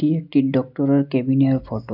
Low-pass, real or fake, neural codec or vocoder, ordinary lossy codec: 5.4 kHz; real; none; none